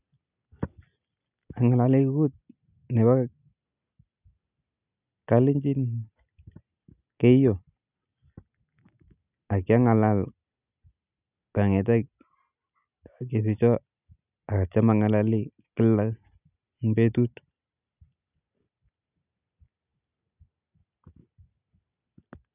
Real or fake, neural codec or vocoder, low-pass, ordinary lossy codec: real; none; 3.6 kHz; none